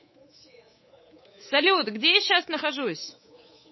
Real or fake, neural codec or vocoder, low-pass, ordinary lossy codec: fake; codec, 24 kHz, 3.1 kbps, DualCodec; 7.2 kHz; MP3, 24 kbps